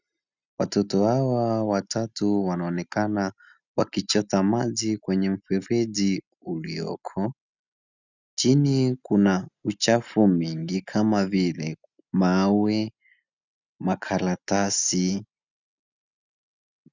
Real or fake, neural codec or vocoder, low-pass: real; none; 7.2 kHz